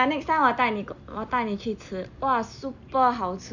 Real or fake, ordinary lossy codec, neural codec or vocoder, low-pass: real; none; none; 7.2 kHz